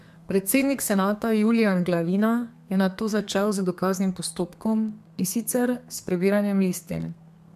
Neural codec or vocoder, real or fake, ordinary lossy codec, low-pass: codec, 32 kHz, 1.9 kbps, SNAC; fake; MP3, 96 kbps; 14.4 kHz